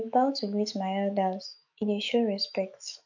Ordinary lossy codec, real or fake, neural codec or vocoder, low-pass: none; fake; autoencoder, 48 kHz, 128 numbers a frame, DAC-VAE, trained on Japanese speech; 7.2 kHz